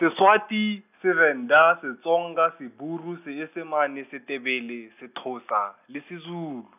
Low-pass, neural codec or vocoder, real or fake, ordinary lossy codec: 3.6 kHz; none; real; none